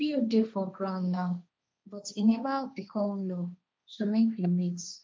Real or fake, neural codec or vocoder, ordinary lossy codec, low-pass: fake; codec, 16 kHz, 1.1 kbps, Voila-Tokenizer; none; none